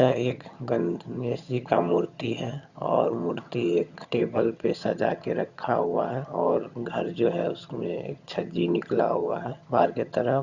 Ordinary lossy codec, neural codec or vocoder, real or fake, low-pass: Opus, 64 kbps; vocoder, 22.05 kHz, 80 mel bands, HiFi-GAN; fake; 7.2 kHz